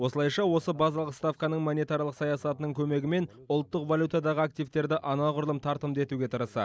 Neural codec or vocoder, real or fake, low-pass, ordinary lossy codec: none; real; none; none